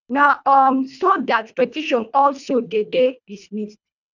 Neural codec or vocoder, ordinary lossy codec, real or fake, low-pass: codec, 24 kHz, 1.5 kbps, HILCodec; none; fake; 7.2 kHz